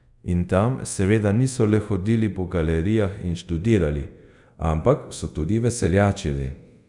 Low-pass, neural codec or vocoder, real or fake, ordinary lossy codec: 10.8 kHz; codec, 24 kHz, 0.5 kbps, DualCodec; fake; none